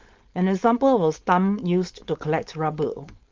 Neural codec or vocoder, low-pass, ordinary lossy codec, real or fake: codec, 16 kHz, 4.8 kbps, FACodec; 7.2 kHz; Opus, 24 kbps; fake